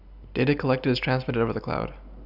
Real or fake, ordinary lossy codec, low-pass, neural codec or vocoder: real; none; 5.4 kHz; none